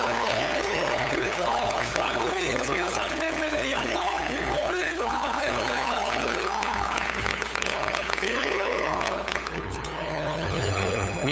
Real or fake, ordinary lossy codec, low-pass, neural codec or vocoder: fake; none; none; codec, 16 kHz, 8 kbps, FunCodec, trained on LibriTTS, 25 frames a second